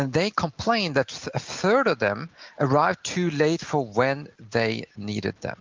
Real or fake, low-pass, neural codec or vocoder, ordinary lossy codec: real; 7.2 kHz; none; Opus, 24 kbps